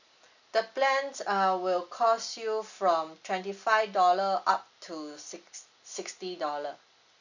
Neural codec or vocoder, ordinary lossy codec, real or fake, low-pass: none; none; real; 7.2 kHz